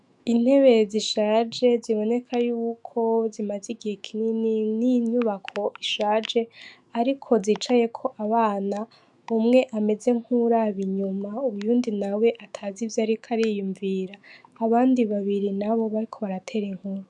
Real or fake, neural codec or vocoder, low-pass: fake; autoencoder, 48 kHz, 128 numbers a frame, DAC-VAE, trained on Japanese speech; 10.8 kHz